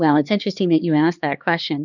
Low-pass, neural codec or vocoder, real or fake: 7.2 kHz; codec, 16 kHz, 2 kbps, X-Codec, HuBERT features, trained on LibriSpeech; fake